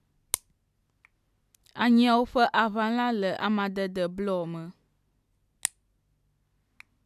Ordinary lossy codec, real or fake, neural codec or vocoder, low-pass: AAC, 96 kbps; real; none; 14.4 kHz